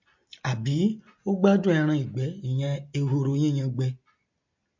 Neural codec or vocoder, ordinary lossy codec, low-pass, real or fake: none; MP3, 48 kbps; 7.2 kHz; real